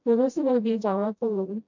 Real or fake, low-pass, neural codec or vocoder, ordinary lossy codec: fake; 7.2 kHz; codec, 16 kHz, 0.5 kbps, FreqCodec, smaller model; MP3, 48 kbps